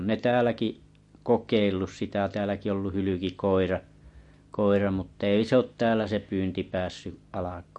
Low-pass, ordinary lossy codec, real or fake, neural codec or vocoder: 10.8 kHz; MP3, 48 kbps; real; none